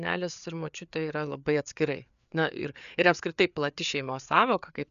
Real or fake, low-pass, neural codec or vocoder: fake; 7.2 kHz; codec, 16 kHz, 4 kbps, FreqCodec, larger model